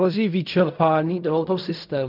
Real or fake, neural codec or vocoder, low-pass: fake; codec, 16 kHz in and 24 kHz out, 0.4 kbps, LongCat-Audio-Codec, fine tuned four codebook decoder; 5.4 kHz